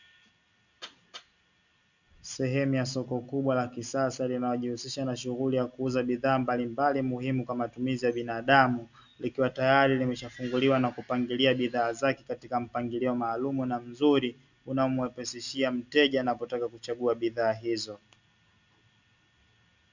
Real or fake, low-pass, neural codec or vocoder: real; 7.2 kHz; none